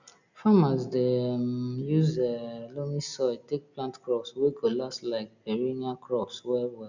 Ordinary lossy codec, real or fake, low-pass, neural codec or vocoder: none; real; 7.2 kHz; none